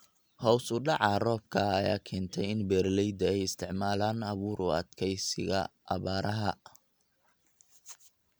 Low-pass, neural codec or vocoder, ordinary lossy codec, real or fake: none; none; none; real